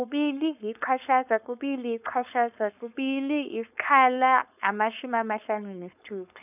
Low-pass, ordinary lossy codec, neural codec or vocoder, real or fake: 3.6 kHz; none; codec, 16 kHz, 4.8 kbps, FACodec; fake